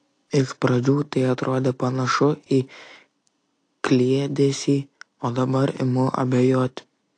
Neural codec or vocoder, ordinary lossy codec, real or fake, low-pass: none; AAC, 48 kbps; real; 9.9 kHz